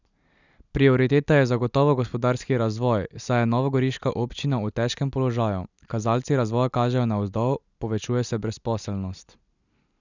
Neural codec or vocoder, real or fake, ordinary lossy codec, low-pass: none; real; none; 7.2 kHz